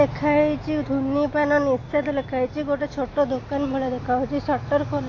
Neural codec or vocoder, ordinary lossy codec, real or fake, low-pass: none; AAC, 32 kbps; real; 7.2 kHz